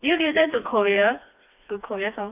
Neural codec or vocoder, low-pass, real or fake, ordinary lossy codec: codec, 16 kHz, 2 kbps, FreqCodec, smaller model; 3.6 kHz; fake; none